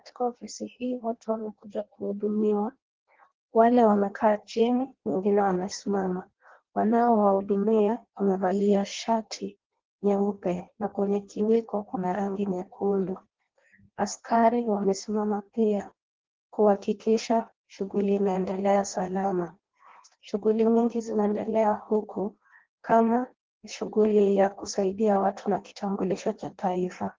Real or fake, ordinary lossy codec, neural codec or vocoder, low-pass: fake; Opus, 16 kbps; codec, 16 kHz in and 24 kHz out, 0.6 kbps, FireRedTTS-2 codec; 7.2 kHz